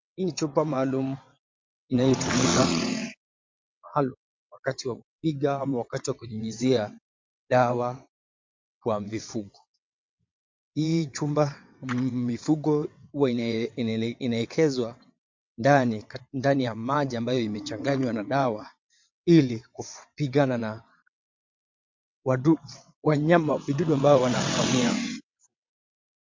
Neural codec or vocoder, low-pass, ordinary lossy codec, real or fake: vocoder, 22.05 kHz, 80 mel bands, WaveNeXt; 7.2 kHz; MP3, 48 kbps; fake